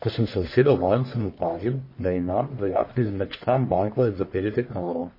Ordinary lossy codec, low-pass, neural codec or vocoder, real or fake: MP3, 24 kbps; 5.4 kHz; codec, 44.1 kHz, 1.7 kbps, Pupu-Codec; fake